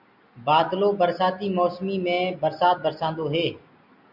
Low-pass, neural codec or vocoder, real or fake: 5.4 kHz; none; real